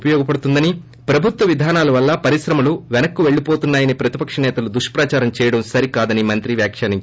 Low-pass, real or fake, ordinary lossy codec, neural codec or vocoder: none; real; none; none